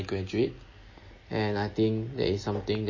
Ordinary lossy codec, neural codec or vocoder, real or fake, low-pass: MP3, 32 kbps; none; real; 7.2 kHz